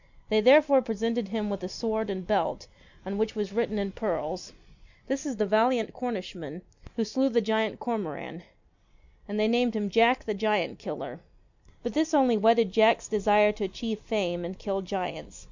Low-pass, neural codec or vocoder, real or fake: 7.2 kHz; none; real